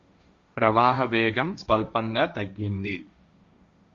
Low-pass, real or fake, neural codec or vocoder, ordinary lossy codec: 7.2 kHz; fake; codec, 16 kHz, 1.1 kbps, Voila-Tokenizer; Opus, 64 kbps